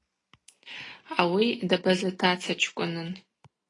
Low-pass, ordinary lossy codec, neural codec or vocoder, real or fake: 10.8 kHz; AAC, 32 kbps; none; real